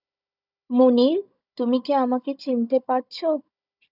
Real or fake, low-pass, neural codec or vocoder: fake; 5.4 kHz; codec, 16 kHz, 16 kbps, FunCodec, trained on Chinese and English, 50 frames a second